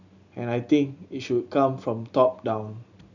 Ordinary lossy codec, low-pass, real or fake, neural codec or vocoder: none; 7.2 kHz; real; none